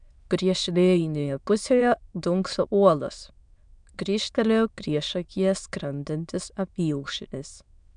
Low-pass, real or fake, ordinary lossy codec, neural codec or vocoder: 9.9 kHz; fake; MP3, 96 kbps; autoencoder, 22.05 kHz, a latent of 192 numbers a frame, VITS, trained on many speakers